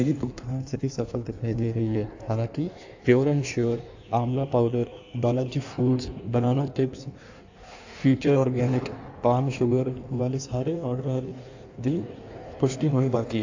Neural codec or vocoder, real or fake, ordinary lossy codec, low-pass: codec, 16 kHz in and 24 kHz out, 1.1 kbps, FireRedTTS-2 codec; fake; AAC, 48 kbps; 7.2 kHz